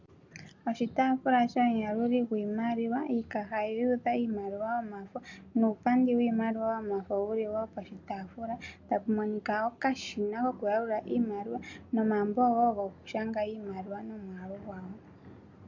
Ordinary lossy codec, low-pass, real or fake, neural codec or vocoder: MP3, 64 kbps; 7.2 kHz; real; none